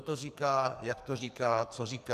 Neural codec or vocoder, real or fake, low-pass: codec, 44.1 kHz, 2.6 kbps, SNAC; fake; 14.4 kHz